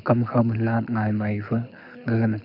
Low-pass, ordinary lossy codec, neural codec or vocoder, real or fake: 5.4 kHz; none; codec, 24 kHz, 6 kbps, HILCodec; fake